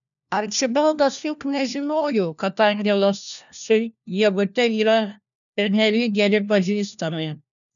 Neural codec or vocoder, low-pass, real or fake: codec, 16 kHz, 1 kbps, FunCodec, trained on LibriTTS, 50 frames a second; 7.2 kHz; fake